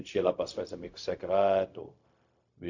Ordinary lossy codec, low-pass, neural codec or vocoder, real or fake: MP3, 48 kbps; 7.2 kHz; codec, 16 kHz, 0.4 kbps, LongCat-Audio-Codec; fake